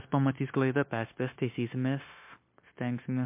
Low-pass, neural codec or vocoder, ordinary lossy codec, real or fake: 3.6 kHz; codec, 16 kHz, 0.9 kbps, LongCat-Audio-Codec; MP3, 24 kbps; fake